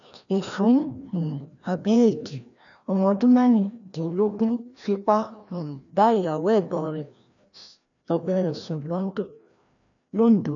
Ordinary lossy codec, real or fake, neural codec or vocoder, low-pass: none; fake; codec, 16 kHz, 1 kbps, FreqCodec, larger model; 7.2 kHz